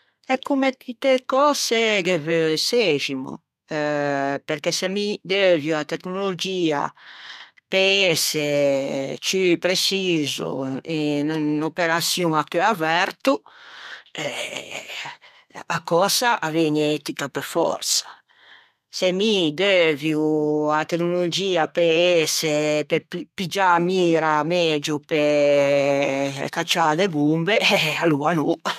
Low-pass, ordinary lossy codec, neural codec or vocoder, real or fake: 14.4 kHz; none; codec, 32 kHz, 1.9 kbps, SNAC; fake